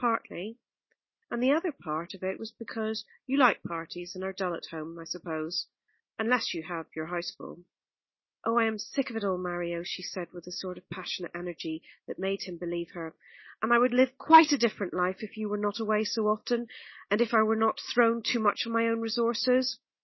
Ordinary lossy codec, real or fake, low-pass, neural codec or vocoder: MP3, 24 kbps; real; 7.2 kHz; none